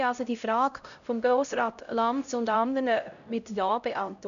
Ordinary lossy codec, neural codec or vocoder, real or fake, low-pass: none; codec, 16 kHz, 0.5 kbps, X-Codec, HuBERT features, trained on LibriSpeech; fake; 7.2 kHz